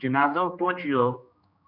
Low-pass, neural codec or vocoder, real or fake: 5.4 kHz; codec, 16 kHz, 2 kbps, X-Codec, HuBERT features, trained on general audio; fake